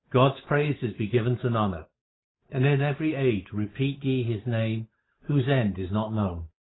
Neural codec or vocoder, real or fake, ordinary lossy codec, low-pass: codec, 16 kHz, 8 kbps, FunCodec, trained on Chinese and English, 25 frames a second; fake; AAC, 16 kbps; 7.2 kHz